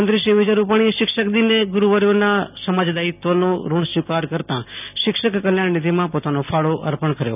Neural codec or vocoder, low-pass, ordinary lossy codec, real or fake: none; 3.6 kHz; none; real